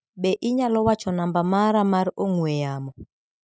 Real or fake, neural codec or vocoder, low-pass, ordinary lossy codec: real; none; none; none